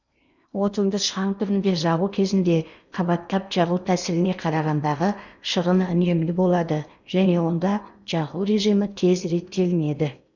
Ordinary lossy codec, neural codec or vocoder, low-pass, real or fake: none; codec, 16 kHz in and 24 kHz out, 0.8 kbps, FocalCodec, streaming, 65536 codes; 7.2 kHz; fake